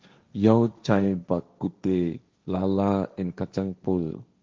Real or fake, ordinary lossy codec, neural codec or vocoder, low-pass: fake; Opus, 32 kbps; codec, 16 kHz, 1.1 kbps, Voila-Tokenizer; 7.2 kHz